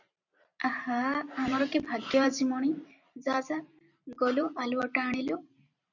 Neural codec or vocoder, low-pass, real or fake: vocoder, 44.1 kHz, 128 mel bands every 512 samples, BigVGAN v2; 7.2 kHz; fake